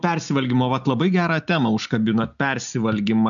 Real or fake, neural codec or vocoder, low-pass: real; none; 7.2 kHz